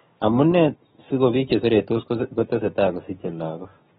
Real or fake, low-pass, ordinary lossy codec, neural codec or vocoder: fake; 7.2 kHz; AAC, 16 kbps; codec, 16 kHz, 6 kbps, DAC